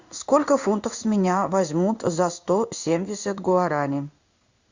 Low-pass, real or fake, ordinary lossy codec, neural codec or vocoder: 7.2 kHz; fake; Opus, 64 kbps; codec, 16 kHz in and 24 kHz out, 1 kbps, XY-Tokenizer